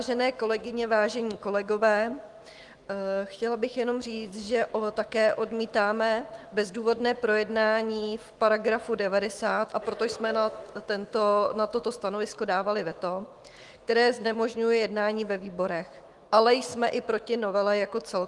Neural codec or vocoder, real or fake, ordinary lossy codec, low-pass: autoencoder, 48 kHz, 128 numbers a frame, DAC-VAE, trained on Japanese speech; fake; Opus, 24 kbps; 10.8 kHz